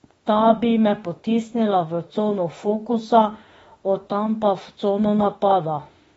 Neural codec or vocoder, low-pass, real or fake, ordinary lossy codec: autoencoder, 48 kHz, 32 numbers a frame, DAC-VAE, trained on Japanese speech; 19.8 kHz; fake; AAC, 24 kbps